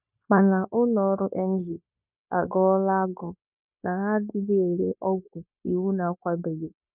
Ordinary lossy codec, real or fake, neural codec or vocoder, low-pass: none; fake; codec, 16 kHz, 0.9 kbps, LongCat-Audio-Codec; 3.6 kHz